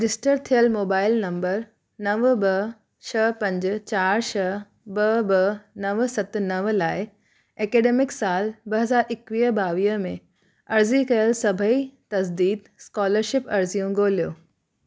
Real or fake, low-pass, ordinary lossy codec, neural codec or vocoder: real; none; none; none